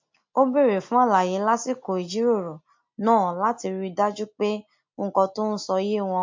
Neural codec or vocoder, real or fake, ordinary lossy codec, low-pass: none; real; MP3, 48 kbps; 7.2 kHz